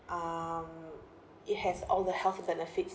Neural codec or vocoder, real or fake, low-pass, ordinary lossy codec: none; real; none; none